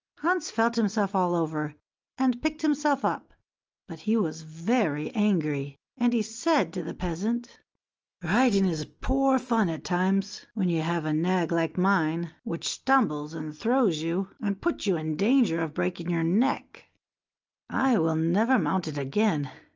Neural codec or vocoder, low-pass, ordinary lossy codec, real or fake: none; 7.2 kHz; Opus, 24 kbps; real